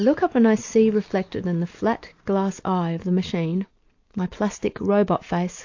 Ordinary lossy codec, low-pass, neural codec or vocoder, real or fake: AAC, 48 kbps; 7.2 kHz; none; real